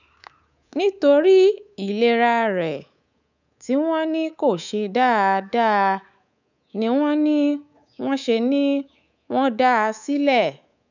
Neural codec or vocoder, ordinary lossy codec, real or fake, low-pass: codec, 24 kHz, 3.1 kbps, DualCodec; none; fake; 7.2 kHz